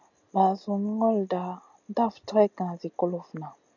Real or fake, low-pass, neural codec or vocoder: real; 7.2 kHz; none